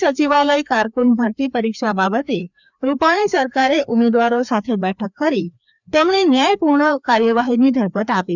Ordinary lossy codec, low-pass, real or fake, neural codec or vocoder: none; 7.2 kHz; fake; codec, 16 kHz, 2 kbps, FreqCodec, larger model